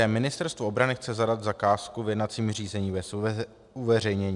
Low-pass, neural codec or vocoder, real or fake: 10.8 kHz; none; real